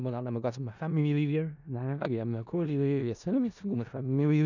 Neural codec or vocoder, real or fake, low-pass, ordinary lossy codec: codec, 16 kHz in and 24 kHz out, 0.4 kbps, LongCat-Audio-Codec, four codebook decoder; fake; 7.2 kHz; none